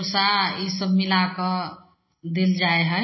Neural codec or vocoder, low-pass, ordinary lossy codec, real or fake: none; 7.2 kHz; MP3, 24 kbps; real